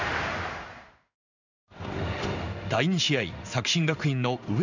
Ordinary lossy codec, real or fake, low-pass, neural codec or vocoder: none; fake; 7.2 kHz; codec, 16 kHz in and 24 kHz out, 1 kbps, XY-Tokenizer